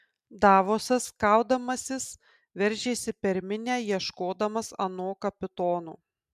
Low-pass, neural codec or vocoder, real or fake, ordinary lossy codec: 14.4 kHz; none; real; MP3, 96 kbps